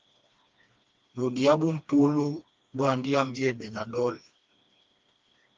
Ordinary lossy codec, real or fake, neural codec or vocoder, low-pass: Opus, 32 kbps; fake; codec, 16 kHz, 2 kbps, FreqCodec, smaller model; 7.2 kHz